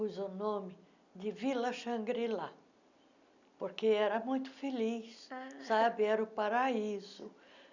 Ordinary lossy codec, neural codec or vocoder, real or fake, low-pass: none; none; real; 7.2 kHz